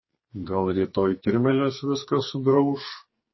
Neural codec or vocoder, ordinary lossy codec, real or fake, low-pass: codec, 44.1 kHz, 2.6 kbps, SNAC; MP3, 24 kbps; fake; 7.2 kHz